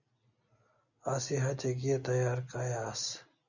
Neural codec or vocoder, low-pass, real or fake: none; 7.2 kHz; real